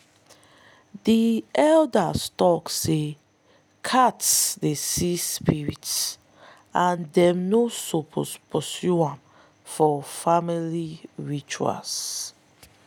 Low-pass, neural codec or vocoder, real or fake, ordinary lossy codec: none; none; real; none